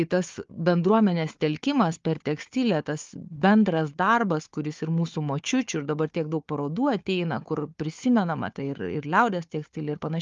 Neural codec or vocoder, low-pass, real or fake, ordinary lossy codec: codec, 16 kHz, 4 kbps, FunCodec, trained on Chinese and English, 50 frames a second; 7.2 kHz; fake; Opus, 32 kbps